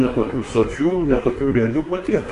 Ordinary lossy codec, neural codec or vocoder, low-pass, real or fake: AAC, 48 kbps; codec, 24 kHz, 1 kbps, SNAC; 10.8 kHz; fake